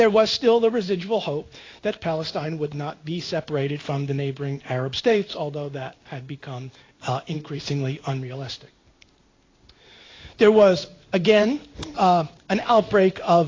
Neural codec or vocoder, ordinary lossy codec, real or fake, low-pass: codec, 16 kHz in and 24 kHz out, 1 kbps, XY-Tokenizer; AAC, 32 kbps; fake; 7.2 kHz